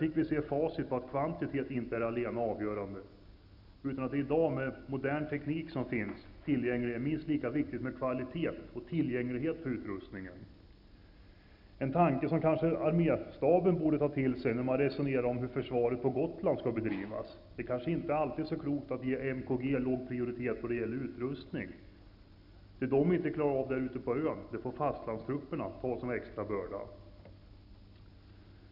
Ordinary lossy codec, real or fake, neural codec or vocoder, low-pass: none; real; none; 5.4 kHz